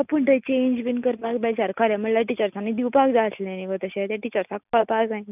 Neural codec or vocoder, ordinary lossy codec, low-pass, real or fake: none; none; 3.6 kHz; real